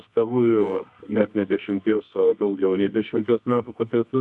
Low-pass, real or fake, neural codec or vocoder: 10.8 kHz; fake; codec, 24 kHz, 0.9 kbps, WavTokenizer, medium music audio release